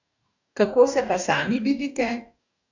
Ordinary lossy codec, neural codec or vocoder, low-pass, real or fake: none; codec, 44.1 kHz, 2.6 kbps, DAC; 7.2 kHz; fake